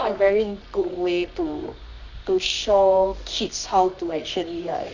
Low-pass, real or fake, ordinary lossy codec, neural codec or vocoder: 7.2 kHz; fake; none; codec, 24 kHz, 0.9 kbps, WavTokenizer, medium music audio release